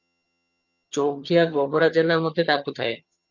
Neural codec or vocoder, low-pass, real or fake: vocoder, 22.05 kHz, 80 mel bands, HiFi-GAN; 7.2 kHz; fake